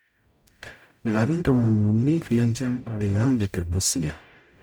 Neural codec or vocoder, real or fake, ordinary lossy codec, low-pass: codec, 44.1 kHz, 0.9 kbps, DAC; fake; none; none